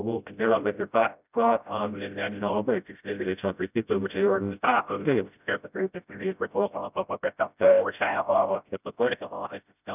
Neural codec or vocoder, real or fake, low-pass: codec, 16 kHz, 0.5 kbps, FreqCodec, smaller model; fake; 3.6 kHz